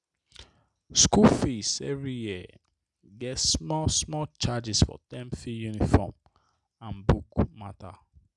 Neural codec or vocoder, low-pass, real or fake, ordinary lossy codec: none; 10.8 kHz; real; none